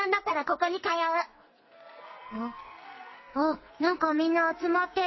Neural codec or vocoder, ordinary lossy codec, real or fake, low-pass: codec, 44.1 kHz, 2.6 kbps, SNAC; MP3, 24 kbps; fake; 7.2 kHz